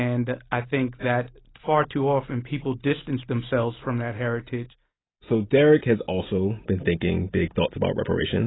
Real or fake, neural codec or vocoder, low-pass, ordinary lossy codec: real; none; 7.2 kHz; AAC, 16 kbps